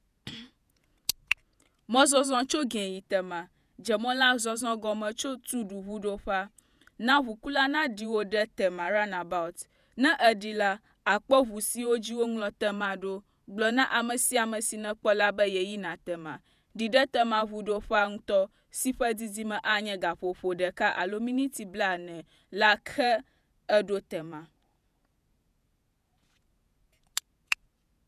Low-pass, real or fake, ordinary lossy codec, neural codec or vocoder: 14.4 kHz; fake; none; vocoder, 48 kHz, 128 mel bands, Vocos